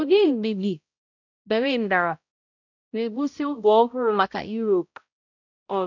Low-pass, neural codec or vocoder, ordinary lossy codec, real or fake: 7.2 kHz; codec, 16 kHz, 0.5 kbps, X-Codec, HuBERT features, trained on balanced general audio; none; fake